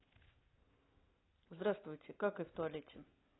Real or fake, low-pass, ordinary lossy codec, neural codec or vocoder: real; 7.2 kHz; AAC, 16 kbps; none